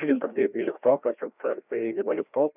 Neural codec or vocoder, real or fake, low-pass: codec, 16 kHz, 1 kbps, FreqCodec, larger model; fake; 3.6 kHz